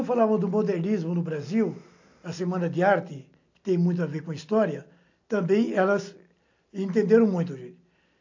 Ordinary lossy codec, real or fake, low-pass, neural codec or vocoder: AAC, 48 kbps; real; 7.2 kHz; none